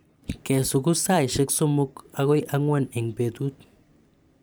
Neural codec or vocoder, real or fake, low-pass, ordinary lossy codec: vocoder, 44.1 kHz, 128 mel bands every 512 samples, BigVGAN v2; fake; none; none